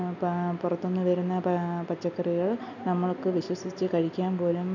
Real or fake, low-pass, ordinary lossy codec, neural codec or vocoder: real; 7.2 kHz; none; none